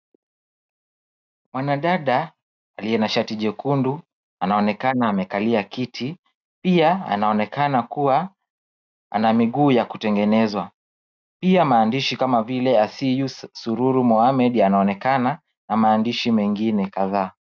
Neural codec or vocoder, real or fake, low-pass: none; real; 7.2 kHz